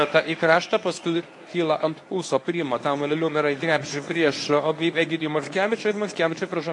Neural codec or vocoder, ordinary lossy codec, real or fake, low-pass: codec, 24 kHz, 0.9 kbps, WavTokenizer, medium speech release version 1; AAC, 48 kbps; fake; 10.8 kHz